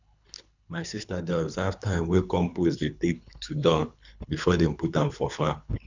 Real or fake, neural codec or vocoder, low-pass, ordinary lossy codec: fake; codec, 24 kHz, 3 kbps, HILCodec; 7.2 kHz; none